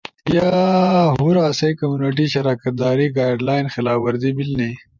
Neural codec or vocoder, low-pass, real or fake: vocoder, 24 kHz, 100 mel bands, Vocos; 7.2 kHz; fake